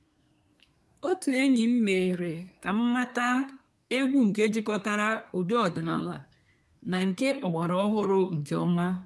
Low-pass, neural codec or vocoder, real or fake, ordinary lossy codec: none; codec, 24 kHz, 1 kbps, SNAC; fake; none